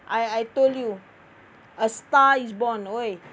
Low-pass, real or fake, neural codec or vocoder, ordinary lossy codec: none; real; none; none